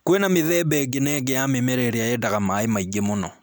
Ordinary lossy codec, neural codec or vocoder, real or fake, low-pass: none; none; real; none